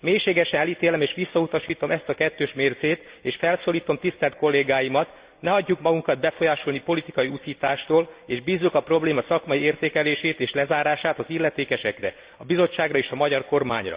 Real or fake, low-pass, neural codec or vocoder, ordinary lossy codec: real; 3.6 kHz; none; Opus, 64 kbps